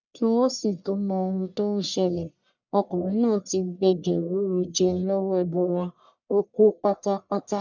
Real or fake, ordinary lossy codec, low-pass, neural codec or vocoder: fake; none; 7.2 kHz; codec, 44.1 kHz, 1.7 kbps, Pupu-Codec